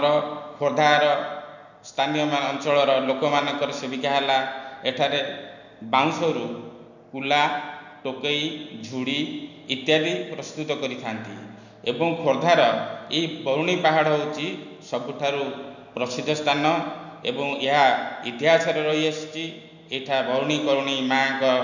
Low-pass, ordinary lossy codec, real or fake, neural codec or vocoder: 7.2 kHz; none; real; none